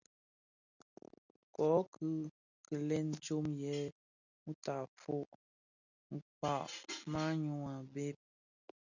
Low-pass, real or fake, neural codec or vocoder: 7.2 kHz; real; none